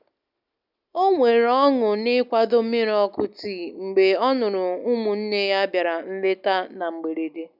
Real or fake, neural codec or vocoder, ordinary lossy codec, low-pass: real; none; none; 5.4 kHz